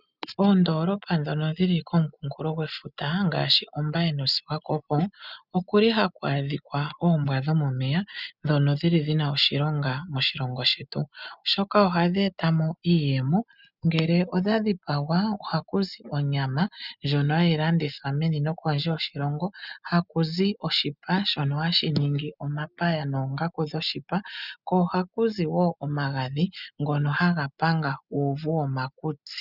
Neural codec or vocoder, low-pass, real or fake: none; 5.4 kHz; real